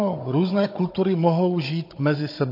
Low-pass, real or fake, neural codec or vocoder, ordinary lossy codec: 5.4 kHz; fake; codec, 16 kHz, 16 kbps, FunCodec, trained on Chinese and English, 50 frames a second; MP3, 32 kbps